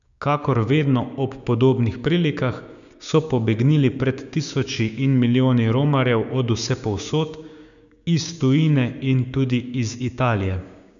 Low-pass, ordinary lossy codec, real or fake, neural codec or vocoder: 7.2 kHz; none; fake; codec, 16 kHz, 6 kbps, DAC